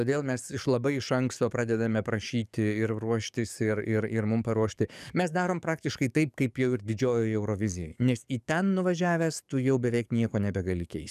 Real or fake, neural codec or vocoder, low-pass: fake; codec, 44.1 kHz, 7.8 kbps, DAC; 14.4 kHz